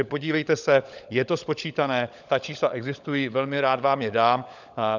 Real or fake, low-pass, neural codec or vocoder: fake; 7.2 kHz; codec, 16 kHz, 16 kbps, FunCodec, trained on LibriTTS, 50 frames a second